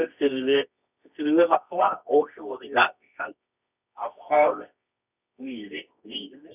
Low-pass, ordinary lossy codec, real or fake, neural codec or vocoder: 3.6 kHz; none; fake; codec, 24 kHz, 0.9 kbps, WavTokenizer, medium music audio release